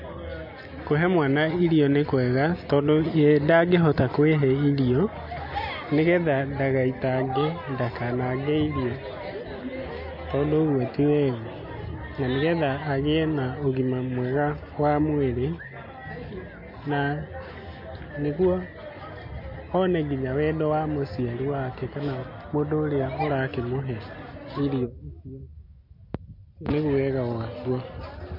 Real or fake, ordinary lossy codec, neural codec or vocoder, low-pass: real; MP3, 32 kbps; none; 5.4 kHz